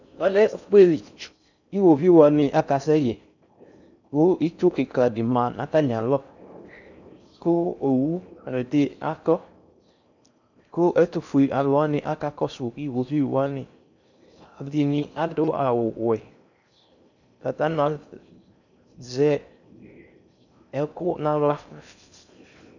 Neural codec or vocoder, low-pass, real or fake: codec, 16 kHz in and 24 kHz out, 0.6 kbps, FocalCodec, streaming, 4096 codes; 7.2 kHz; fake